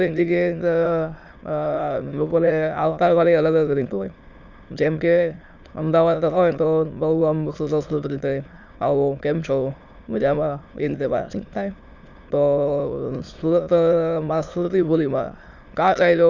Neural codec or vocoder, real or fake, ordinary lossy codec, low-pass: autoencoder, 22.05 kHz, a latent of 192 numbers a frame, VITS, trained on many speakers; fake; Opus, 64 kbps; 7.2 kHz